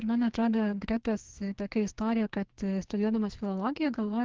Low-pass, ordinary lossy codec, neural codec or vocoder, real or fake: 7.2 kHz; Opus, 16 kbps; codec, 44.1 kHz, 2.6 kbps, SNAC; fake